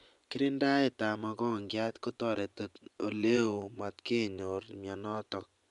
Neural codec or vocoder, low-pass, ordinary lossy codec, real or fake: vocoder, 24 kHz, 100 mel bands, Vocos; 10.8 kHz; none; fake